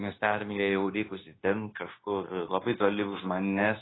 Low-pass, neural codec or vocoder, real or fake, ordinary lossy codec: 7.2 kHz; codec, 16 kHz, 0.9 kbps, LongCat-Audio-Codec; fake; AAC, 16 kbps